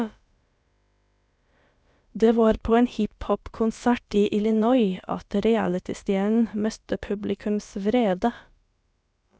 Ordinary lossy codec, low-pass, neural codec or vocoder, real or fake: none; none; codec, 16 kHz, about 1 kbps, DyCAST, with the encoder's durations; fake